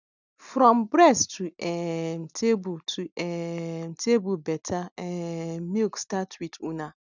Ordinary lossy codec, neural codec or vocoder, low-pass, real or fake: none; none; 7.2 kHz; real